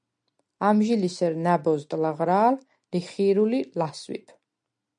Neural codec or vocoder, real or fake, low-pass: none; real; 9.9 kHz